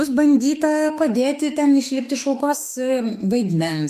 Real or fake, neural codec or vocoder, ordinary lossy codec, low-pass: fake; autoencoder, 48 kHz, 32 numbers a frame, DAC-VAE, trained on Japanese speech; AAC, 96 kbps; 14.4 kHz